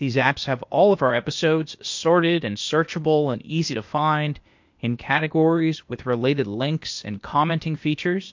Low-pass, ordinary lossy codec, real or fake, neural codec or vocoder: 7.2 kHz; MP3, 48 kbps; fake; codec, 16 kHz, 0.8 kbps, ZipCodec